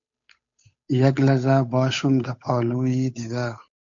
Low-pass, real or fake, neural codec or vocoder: 7.2 kHz; fake; codec, 16 kHz, 8 kbps, FunCodec, trained on Chinese and English, 25 frames a second